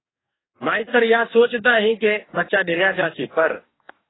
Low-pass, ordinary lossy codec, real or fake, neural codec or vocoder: 7.2 kHz; AAC, 16 kbps; fake; codec, 44.1 kHz, 2.6 kbps, DAC